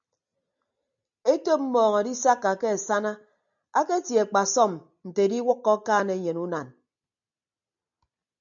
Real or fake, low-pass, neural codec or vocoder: real; 7.2 kHz; none